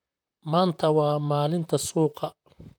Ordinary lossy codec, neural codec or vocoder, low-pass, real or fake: none; vocoder, 44.1 kHz, 128 mel bands, Pupu-Vocoder; none; fake